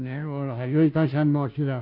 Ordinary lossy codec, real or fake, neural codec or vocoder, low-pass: none; fake; codec, 16 kHz, 0.5 kbps, FunCodec, trained on Chinese and English, 25 frames a second; 5.4 kHz